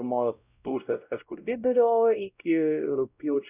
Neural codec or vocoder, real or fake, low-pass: codec, 16 kHz, 0.5 kbps, X-Codec, WavLM features, trained on Multilingual LibriSpeech; fake; 3.6 kHz